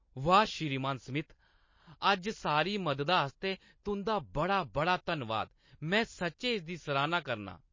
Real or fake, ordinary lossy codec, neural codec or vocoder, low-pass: real; MP3, 32 kbps; none; 7.2 kHz